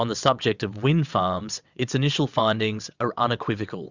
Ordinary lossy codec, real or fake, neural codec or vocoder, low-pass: Opus, 64 kbps; fake; vocoder, 22.05 kHz, 80 mel bands, WaveNeXt; 7.2 kHz